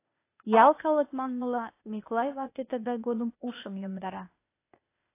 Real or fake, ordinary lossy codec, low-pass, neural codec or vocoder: fake; AAC, 24 kbps; 3.6 kHz; codec, 16 kHz, 0.8 kbps, ZipCodec